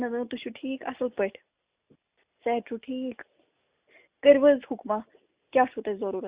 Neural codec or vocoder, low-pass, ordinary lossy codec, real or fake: none; 3.6 kHz; Opus, 64 kbps; real